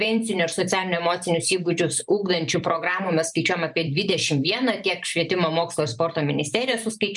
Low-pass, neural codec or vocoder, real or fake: 10.8 kHz; vocoder, 44.1 kHz, 128 mel bands every 512 samples, BigVGAN v2; fake